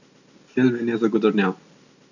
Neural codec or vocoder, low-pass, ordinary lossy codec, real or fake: none; 7.2 kHz; none; real